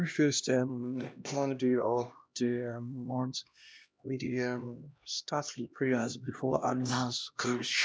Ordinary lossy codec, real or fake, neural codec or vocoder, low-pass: none; fake; codec, 16 kHz, 1 kbps, X-Codec, HuBERT features, trained on LibriSpeech; none